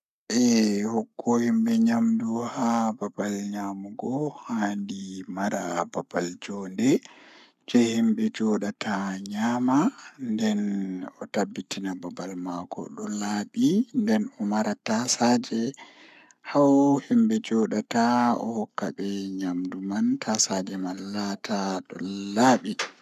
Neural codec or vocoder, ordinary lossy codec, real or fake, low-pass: codec, 44.1 kHz, 7.8 kbps, Pupu-Codec; none; fake; 14.4 kHz